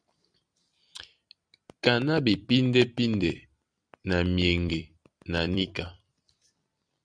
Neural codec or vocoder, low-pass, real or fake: vocoder, 44.1 kHz, 128 mel bands every 256 samples, BigVGAN v2; 9.9 kHz; fake